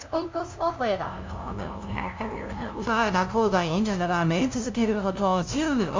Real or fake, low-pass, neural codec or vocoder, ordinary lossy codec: fake; 7.2 kHz; codec, 16 kHz, 0.5 kbps, FunCodec, trained on LibriTTS, 25 frames a second; MP3, 64 kbps